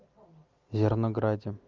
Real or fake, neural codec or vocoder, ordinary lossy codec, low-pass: real; none; Opus, 32 kbps; 7.2 kHz